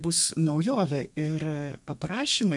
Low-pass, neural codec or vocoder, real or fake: 10.8 kHz; codec, 32 kHz, 1.9 kbps, SNAC; fake